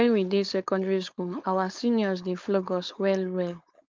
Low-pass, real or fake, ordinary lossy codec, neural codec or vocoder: 7.2 kHz; fake; Opus, 32 kbps; codec, 16 kHz, 4.8 kbps, FACodec